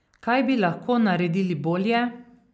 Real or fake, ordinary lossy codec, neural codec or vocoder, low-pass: real; none; none; none